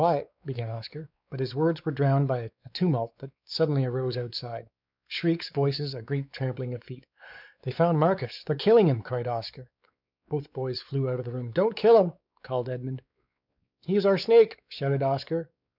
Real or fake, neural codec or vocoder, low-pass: fake; codec, 16 kHz, 4 kbps, X-Codec, WavLM features, trained on Multilingual LibriSpeech; 5.4 kHz